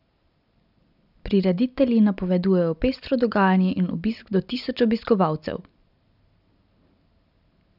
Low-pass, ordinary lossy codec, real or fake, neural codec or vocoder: 5.4 kHz; none; real; none